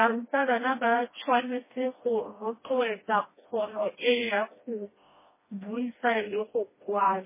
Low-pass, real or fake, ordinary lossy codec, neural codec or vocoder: 3.6 kHz; fake; MP3, 16 kbps; codec, 16 kHz, 1 kbps, FreqCodec, smaller model